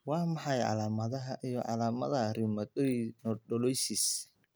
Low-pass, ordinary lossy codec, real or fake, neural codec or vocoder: none; none; real; none